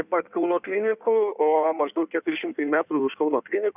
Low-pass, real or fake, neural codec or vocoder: 3.6 kHz; fake; codec, 16 kHz in and 24 kHz out, 1.1 kbps, FireRedTTS-2 codec